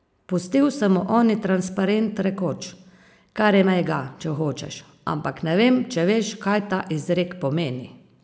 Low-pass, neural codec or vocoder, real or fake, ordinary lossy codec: none; none; real; none